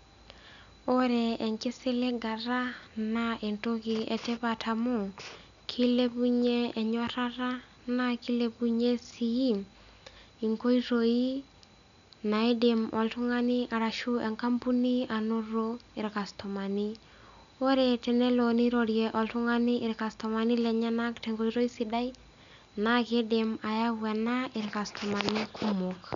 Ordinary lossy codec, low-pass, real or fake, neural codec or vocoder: none; 7.2 kHz; real; none